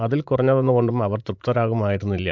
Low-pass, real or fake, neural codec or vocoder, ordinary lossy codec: 7.2 kHz; fake; vocoder, 44.1 kHz, 80 mel bands, Vocos; MP3, 64 kbps